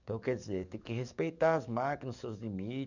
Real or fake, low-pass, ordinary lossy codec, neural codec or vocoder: fake; 7.2 kHz; none; codec, 44.1 kHz, 7.8 kbps, DAC